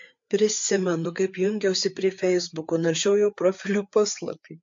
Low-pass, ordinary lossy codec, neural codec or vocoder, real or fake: 7.2 kHz; MP3, 48 kbps; codec, 16 kHz, 4 kbps, FreqCodec, larger model; fake